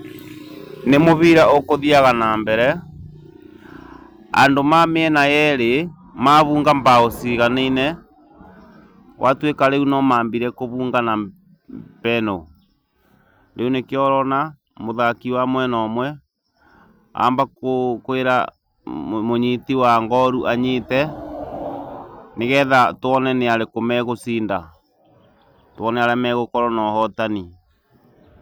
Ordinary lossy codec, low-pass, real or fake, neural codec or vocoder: none; none; real; none